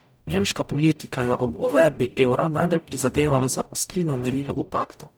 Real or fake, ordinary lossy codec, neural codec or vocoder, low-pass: fake; none; codec, 44.1 kHz, 0.9 kbps, DAC; none